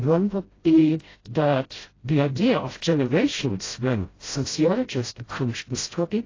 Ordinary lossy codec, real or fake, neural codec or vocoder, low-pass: AAC, 32 kbps; fake; codec, 16 kHz, 0.5 kbps, FreqCodec, smaller model; 7.2 kHz